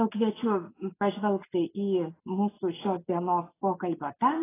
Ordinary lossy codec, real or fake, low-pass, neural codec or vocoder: AAC, 16 kbps; real; 3.6 kHz; none